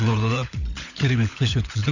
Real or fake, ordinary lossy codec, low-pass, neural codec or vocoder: fake; none; 7.2 kHz; codec, 16 kHz, 16 kbps, FunCodec, trained on LibriTTS, 50 frames a second